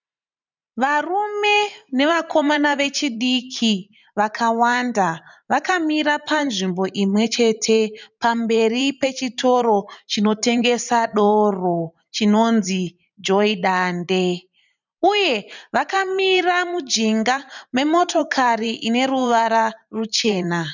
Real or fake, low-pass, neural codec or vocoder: fake; 7.2 kHz; vocoder, 44.1 kHz, 128 mel bands every 512 samples, BigVGAN v2